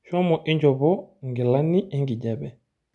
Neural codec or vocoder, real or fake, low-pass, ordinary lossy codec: none; real; 10.8 kHz; none